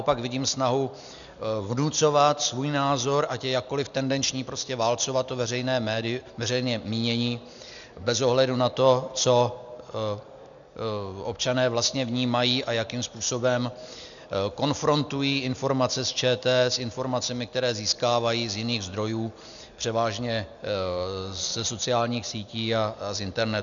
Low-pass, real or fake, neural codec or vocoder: 7.2 kHz; real; none